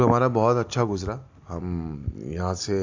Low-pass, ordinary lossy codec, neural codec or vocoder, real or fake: 7.2 kHz; AAC, 48 kbps; none; real